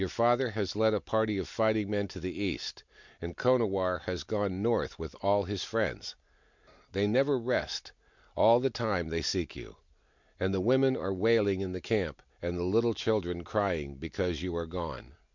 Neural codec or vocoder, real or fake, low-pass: none; real; 7.2 kHz